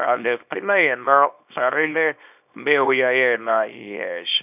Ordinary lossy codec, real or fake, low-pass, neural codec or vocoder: none; fake; 3.6 kHz; codec, 24 kHz, 0.9 kbps, WavTokenizer, small release